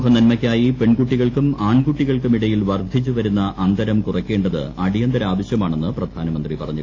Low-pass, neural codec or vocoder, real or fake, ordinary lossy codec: 7.2 kHz; none; real; AAC, 32 kbps